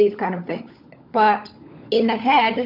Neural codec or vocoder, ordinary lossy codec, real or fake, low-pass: codec, 16 kHz, 16 kbps, FunCodec, trained on LibriTTS, 50 frames a second; AAC, 32 kbps; fake; 5.4 kHz